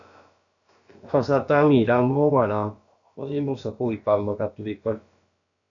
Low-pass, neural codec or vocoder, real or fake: 7.2 kHz; codec, 16 kHz, about 1 kbps, DyCAST, with the encoder's durations; fake